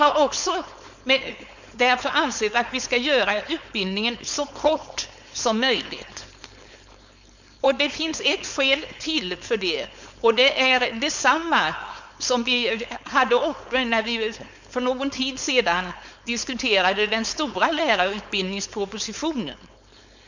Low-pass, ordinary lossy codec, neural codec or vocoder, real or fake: 7.2 kHz; none; codec, 16 kHz, 4.8 kbps, FACodec; fake